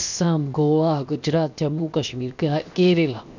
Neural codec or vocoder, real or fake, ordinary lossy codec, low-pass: codec, 16 kHz, 0.7 kbps, FocalCodec; fake; none; 7.2 kHz